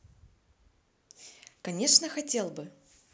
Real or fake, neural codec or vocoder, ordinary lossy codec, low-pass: real; none; none; none